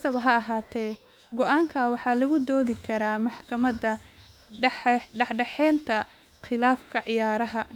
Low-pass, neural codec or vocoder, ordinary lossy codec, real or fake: 19.8 kHz; autoencoder, 48 kHz, 32 numbers a frame, DAC-VAE, trained on Japanese speech; none; fake